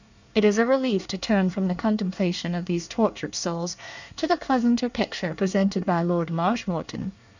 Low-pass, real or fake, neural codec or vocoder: 7.2 kHz; fake; codec, 24 kHz, 1 kbps, SNAC